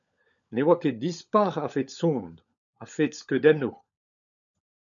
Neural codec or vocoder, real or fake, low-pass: codec, 16 kHz, 16 kbps, FunCodec, trained on LibriTTS, 50 frames a second; fake; 7.2 kHz